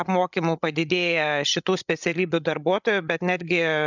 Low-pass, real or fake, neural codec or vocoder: 7.2 kHz; fake; codec, 16 kHz, 16 kbps, FreqCodec, larger model